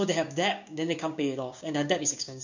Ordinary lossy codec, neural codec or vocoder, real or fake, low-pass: none; codec, 16 kHz, 6 kbps, DAC; fake; 7.2 kHz